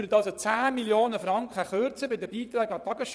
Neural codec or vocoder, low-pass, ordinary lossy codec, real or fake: vocoder, 22.05 kHz, 80 mel bands, Vocos; none; none; fake